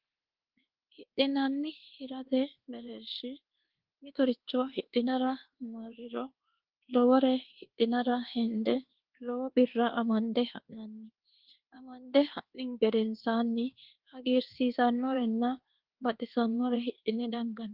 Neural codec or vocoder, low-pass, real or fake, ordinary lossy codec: codec, 24 kHz, 1.2 kbps, DualCodec; 5.4 kHz; fake; Opus, 16 kbps